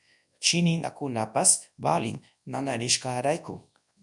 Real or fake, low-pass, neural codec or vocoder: fake; 10.8 kHz; codec, 24 kHz, 0.9 kbps, WavTokenizer, large speech release